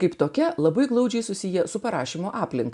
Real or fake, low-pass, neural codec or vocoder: real; 10.8 kHz; none